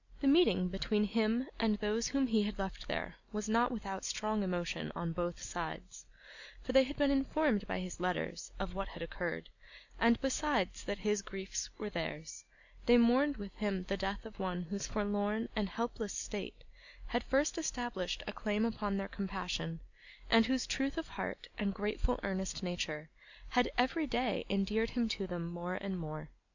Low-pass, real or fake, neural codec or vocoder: 7.2 kHz; real; none